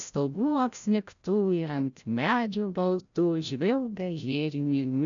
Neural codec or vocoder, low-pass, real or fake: codec, 16 kHz, 0.5 kbps, FreqCodec, larger model; 7.2 kHz; fake